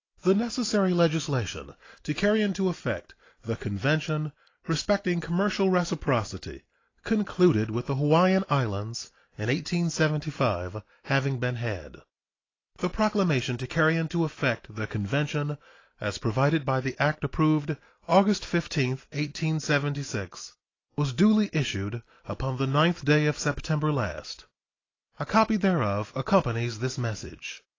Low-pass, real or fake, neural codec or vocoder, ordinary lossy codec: 7.2 kHz; real; none; AAC, 32 kbps